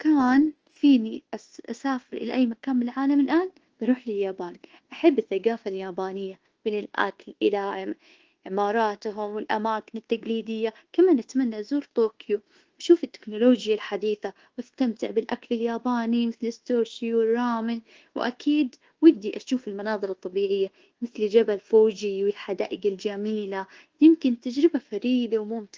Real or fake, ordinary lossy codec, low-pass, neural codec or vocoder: fake; Opus, 16 kbps; 7.2 kHz; codec, 24 kHz, 1.2 kbps, DualCodec